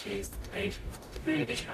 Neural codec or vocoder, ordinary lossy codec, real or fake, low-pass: codec, 44.1 kHz, 0.9 kbps, DAC; AAC, 64 kbps; fake; 14.4 kHz